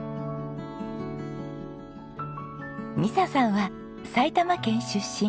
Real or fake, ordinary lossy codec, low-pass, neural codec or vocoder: real; none; none; none